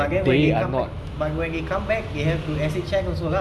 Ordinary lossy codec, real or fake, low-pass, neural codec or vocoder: none; real; none; none